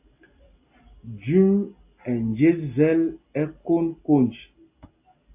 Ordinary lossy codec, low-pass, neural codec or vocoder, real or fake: AAC, 32 kbps; 3.6 kHz; none; real